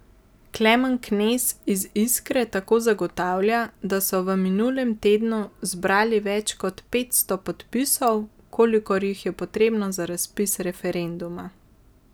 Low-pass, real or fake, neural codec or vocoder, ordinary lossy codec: none; real; none; none